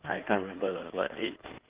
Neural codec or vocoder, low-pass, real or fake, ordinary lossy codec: codec, 16 kHz in and 24 kHz out, 2.2 kbps, FireRedTTS-2 codec; 3.6 kHz; fake; Opus, 32 kbps